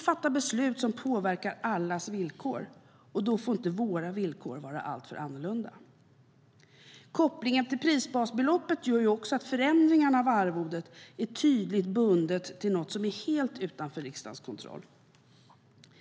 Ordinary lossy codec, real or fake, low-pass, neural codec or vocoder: none; real; none; none